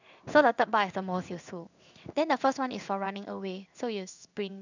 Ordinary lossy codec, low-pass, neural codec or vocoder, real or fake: none; 7.2 kHz; codec, 16 kHz in and 24 kHz out, 1 kbps, XY-Tokenizer; fake